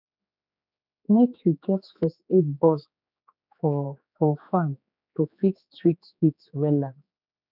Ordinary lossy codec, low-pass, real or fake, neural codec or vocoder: none; 5.4 kHz; fake; codec, 16 kHz, 4 kbps, X-Codec, HuBERT features, trained on general audio